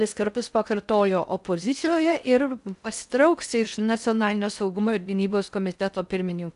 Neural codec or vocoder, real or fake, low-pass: codec, 16 kHz in and 24 kHz out, 0.6 kbps, FocalCodec, streaming, 4096 codes; fake; 10.8 kHz